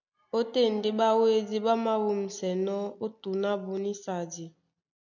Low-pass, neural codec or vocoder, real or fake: 7.2 kHz; none; real